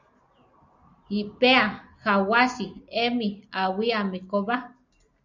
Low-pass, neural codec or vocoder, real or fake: 7.2 kHz; none; real